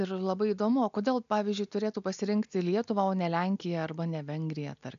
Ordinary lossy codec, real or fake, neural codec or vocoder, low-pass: MP3, 96 kbps; real; none; 7.2 kHz